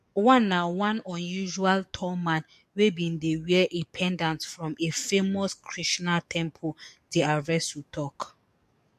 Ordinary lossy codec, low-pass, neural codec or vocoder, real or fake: MP3, 64 kbps; 14.4 kHz; codec, 44.1 kHz, 7.8 kbps, DAC; fake